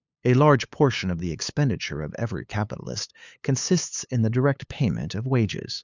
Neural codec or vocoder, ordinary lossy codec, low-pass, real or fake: codec, 16 kHz, 8 kbps, FunCodec, trained on LibriTTS, 25 frames a second; Opus, 64 kbps; 7.2 kHz; fake